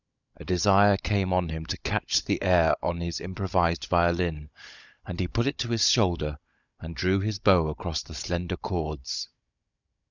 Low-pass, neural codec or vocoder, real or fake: 7.2 kHz; codec, 16 kHz, 16 kbps, FunCodec, trained on Chinese and English, 50 frames a second; fake